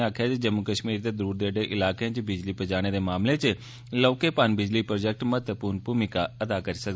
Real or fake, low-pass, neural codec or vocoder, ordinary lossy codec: real; none; none; none